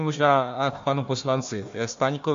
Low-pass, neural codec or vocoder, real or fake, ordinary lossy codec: 7.2 kHz; codec, 16 kHz, 1 kbps, FunCodec, trained on Chinese and English, 50 frames a second; fake; MP3, 48 kbps